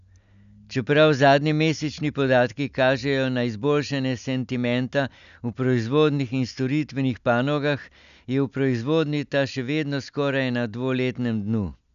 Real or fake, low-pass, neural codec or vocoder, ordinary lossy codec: real; 7.2 kHz; none; none